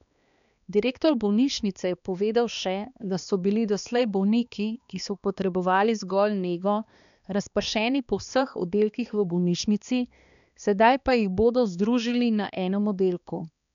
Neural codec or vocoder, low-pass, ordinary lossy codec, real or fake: codec, 16 kHz, 2 kbps, X-Codec, HuBERT features, trained on balanced general audio; 7.2 kHz; none; fake